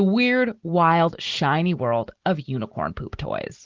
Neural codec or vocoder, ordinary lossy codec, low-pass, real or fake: none; Opus, 16 kbps; 7.2 kHz; real